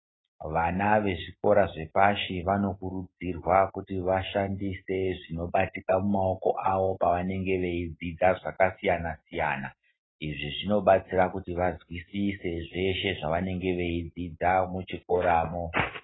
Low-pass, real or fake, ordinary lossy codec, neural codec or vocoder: 7.2 kHz; real; AAC, 16 kbps; none